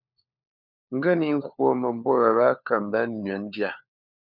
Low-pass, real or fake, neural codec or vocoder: 5.4 kHz; fake; codec, 16 kHz, 4 kbps, FunCodec, trained on LibriTTS, 50 frames a second